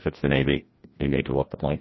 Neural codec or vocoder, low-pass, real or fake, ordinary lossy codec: codec, 16 kHz, 1 kbps, FreqCodec, larger model; 7.2 kHz; fake; MP3, 24 kbps